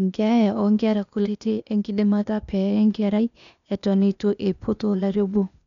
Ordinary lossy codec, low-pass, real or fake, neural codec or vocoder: none; 7.2 kHz; fake; codec, 16 kHz, 0.8 kbps, ZipCodec